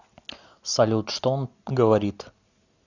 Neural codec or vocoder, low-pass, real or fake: none; 7.2 kHz; real